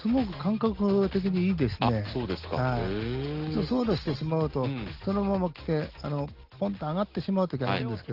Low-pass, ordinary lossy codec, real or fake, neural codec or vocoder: 5.4 kHz; Opus, 16 kbps; real; none